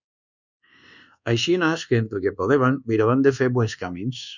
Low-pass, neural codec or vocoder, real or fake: 7.2 kHz; codec, 24 kHz, 1.2 kbps, DualCodec; fake